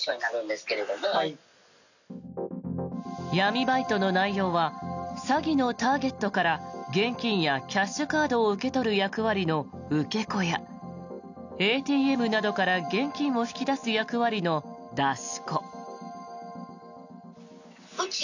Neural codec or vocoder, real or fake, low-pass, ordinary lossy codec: none; real; 7.2 kHz; none